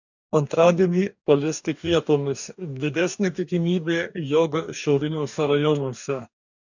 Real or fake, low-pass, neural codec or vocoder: fake; 7.2 kHz; codec, 44.1 kHz, 2.6 kbps, DAC